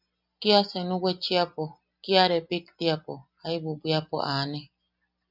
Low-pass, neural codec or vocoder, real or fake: 5.4 kHz; none; real